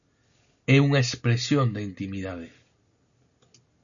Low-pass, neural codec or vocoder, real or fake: 7.2 kHz; none; real